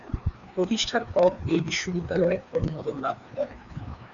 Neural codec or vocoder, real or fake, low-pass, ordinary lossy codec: codec, 16 kHz, 2 kbps, FreqCodec, larger model; fake; 7.2 kHz; MP3, 96 kbps